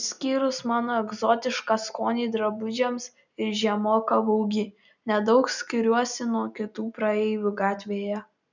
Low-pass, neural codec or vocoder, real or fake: 7.2 kHz; none; real